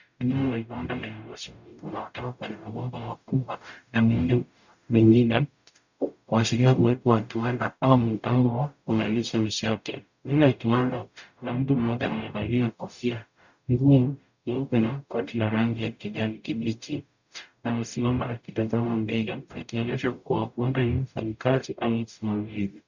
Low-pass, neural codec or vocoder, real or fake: 7.2 kHz; codec, 44.1 kHz, 0.9 kbps, DAC; fake